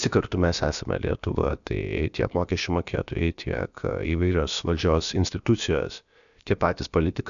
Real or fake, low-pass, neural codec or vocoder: fake; 7.2 kHz; codec, 16 kHz, about 1 kbps, DyCAST, with the encoder's durations